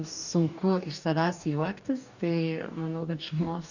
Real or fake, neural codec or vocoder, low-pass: fake; codec, 44.1 kHz, 2.6 kbps, DAC; 7.2 kHz